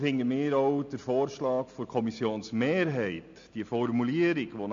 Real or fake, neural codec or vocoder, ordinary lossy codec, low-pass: real; none; MP3, 48 kbps; 7.2 kHz